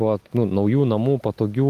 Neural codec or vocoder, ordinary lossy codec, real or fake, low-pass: none; Opus, 24 kbps; real; 14.4 kHz